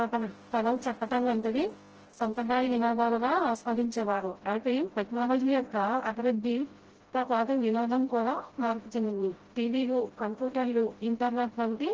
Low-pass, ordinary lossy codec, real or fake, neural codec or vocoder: 7.2 kHz; Opus, 16 kbps; fake; codec, 16 kHz, 0.5 kbps, FreqCodec, smaller model